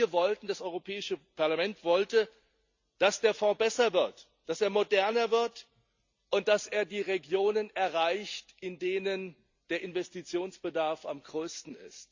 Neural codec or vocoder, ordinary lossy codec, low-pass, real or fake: none; Opus, 64 kbps; 7.2 kHz; real